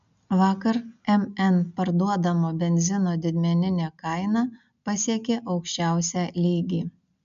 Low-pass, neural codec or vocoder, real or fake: 7.2 kHz; none; real